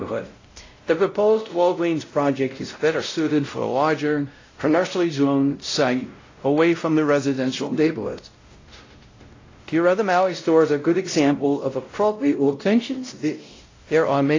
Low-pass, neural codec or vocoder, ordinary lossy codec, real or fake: 7.2 kHz; codec, 16 kHz, 0.5 kbps, X-Codec, WavLM features, trained on Multilingual LibriSpeech; AAC, 32 kbps; fake